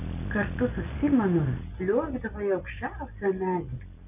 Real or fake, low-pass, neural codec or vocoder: real; 3.6 kHz; none